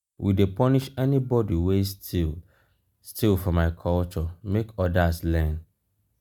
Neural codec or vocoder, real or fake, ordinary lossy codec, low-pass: none; real; none; 19.8 kHz